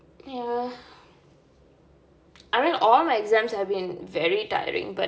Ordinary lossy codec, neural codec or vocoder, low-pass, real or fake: none; none; none; real